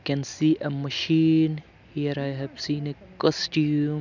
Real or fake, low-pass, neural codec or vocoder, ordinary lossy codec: real; 7.2 kHz; none; none